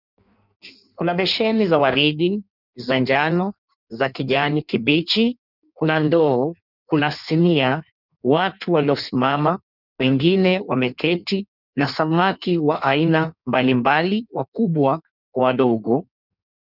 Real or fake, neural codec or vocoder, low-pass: fake; codec, 16 kHz in and 24 kHz out, 1.1 kbps, FireRedTTS-2 codec; 5.4 kHz